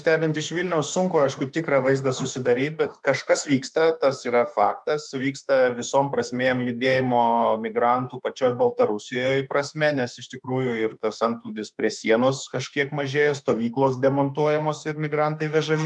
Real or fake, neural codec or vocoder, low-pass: fake; autoencoder, 48 kHz, 32 numbers a frame, DAC-VAE, trained on Japanese speech; 10.8 kHz